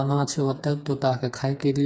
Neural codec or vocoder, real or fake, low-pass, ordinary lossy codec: codec, 16 kHz, 4 kbps, FreqCodec, smaller model; fake; none; none